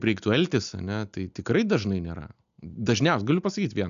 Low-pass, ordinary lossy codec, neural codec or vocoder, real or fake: 7.2 kHz; MP3, 96 kbps; none; real